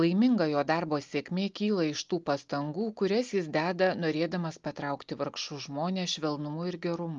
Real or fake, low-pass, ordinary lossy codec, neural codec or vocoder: real; 7.2 kHz; Opus, 32 kbps; none